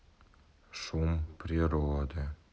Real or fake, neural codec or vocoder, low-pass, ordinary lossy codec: real; none; none; none